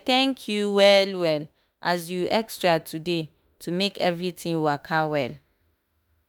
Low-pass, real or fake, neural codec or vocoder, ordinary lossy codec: none; fake; autoencoder, 48 kHz, 32 numbers a frame, DAC-VAE, trained on Japanese speech; none